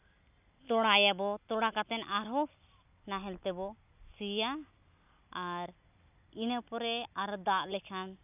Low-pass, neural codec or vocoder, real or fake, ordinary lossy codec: 3.6 kHz; none; real; none